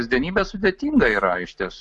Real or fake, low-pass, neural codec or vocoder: real; 10.8 kHz; none